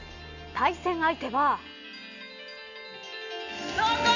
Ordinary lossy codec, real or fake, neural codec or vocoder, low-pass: none; real; none; 7.2 kHz